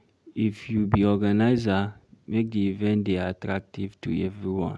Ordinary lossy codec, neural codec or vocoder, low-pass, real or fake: none; none; none; real